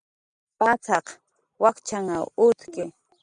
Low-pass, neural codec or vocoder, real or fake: 9.9 kHz; none; real